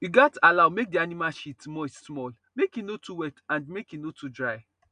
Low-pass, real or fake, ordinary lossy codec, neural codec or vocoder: 9.9 kHz; real; none; none